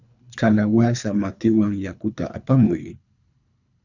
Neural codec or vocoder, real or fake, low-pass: codec, 24 kHz, 3 kbps, HILCodec; fake; 7.2 kHz